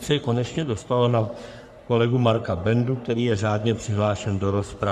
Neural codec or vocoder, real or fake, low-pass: codec, 44.1 kHz, 3.4 kbps, Pupu-Codec; fake; 14.4 kHz